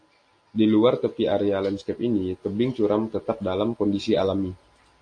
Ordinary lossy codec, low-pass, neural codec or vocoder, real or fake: AAC, 48 kbps; 9.9 kHz; none; real